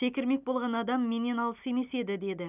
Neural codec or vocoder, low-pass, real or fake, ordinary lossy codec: none; 3.6 kHz; real; none